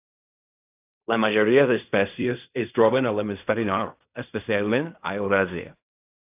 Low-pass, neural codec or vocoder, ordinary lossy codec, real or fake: 3.6 kHz; codec, 16 kHz in and 24 kHz out, 0.4 kbps, LongCat-Audio-Codec, fine tuned four codebook decoder; AAC, 32 kbps; fake